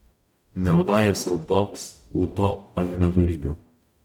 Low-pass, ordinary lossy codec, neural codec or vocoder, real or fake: 19.8 kHz; none; codec, 44.1 kHz, 0.9 kbps, DAC; fake